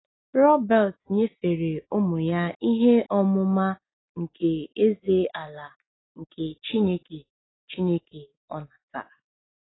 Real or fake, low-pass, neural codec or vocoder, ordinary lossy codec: real; 7.2 kHz; none; AAC, 16 kbps